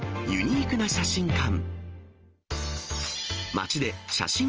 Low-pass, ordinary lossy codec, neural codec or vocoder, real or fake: 7.2 kHz; Opus, 24 kbps; none; real